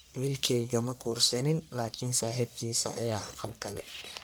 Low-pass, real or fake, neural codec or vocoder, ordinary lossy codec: none; fake; codec, 44.1 kHz, 1.7 kbps, Pupu-Codec; none